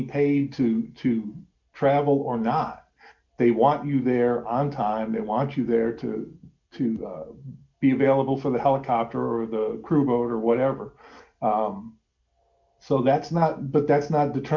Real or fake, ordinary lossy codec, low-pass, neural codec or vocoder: real; MP3, 64 kbps; 7.2 kHz; none